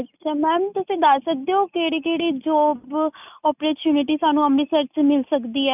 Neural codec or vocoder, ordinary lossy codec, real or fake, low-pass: none; none; real; 3.6 kHz